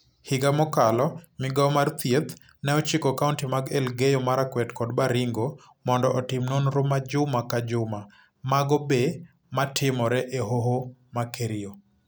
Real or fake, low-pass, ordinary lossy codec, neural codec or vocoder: real; none; none; none